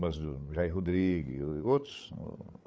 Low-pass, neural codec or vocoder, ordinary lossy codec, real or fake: none; codec, 16 kHz, 8 kbps, FreqCodec, larger model; none; fake